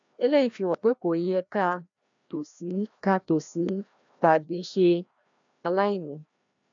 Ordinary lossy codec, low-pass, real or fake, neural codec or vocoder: MP3, 64 kbps; 7.2 kHz; fake; codec, 16 kHz, 1 kbps, FreqCodec, larger model